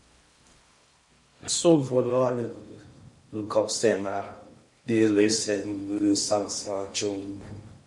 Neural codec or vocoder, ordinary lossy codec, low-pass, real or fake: codec, 16 kHz in and 24 kHz out, 0.6 kbps, FocalCodec, streaming, 4096 codes; MP3, 48 kbps; 10.8 kHz; fake